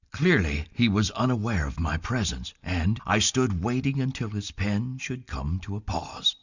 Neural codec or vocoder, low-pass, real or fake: none; 7.2 kHz; real